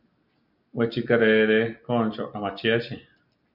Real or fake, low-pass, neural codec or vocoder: real; 5.4 kHz; none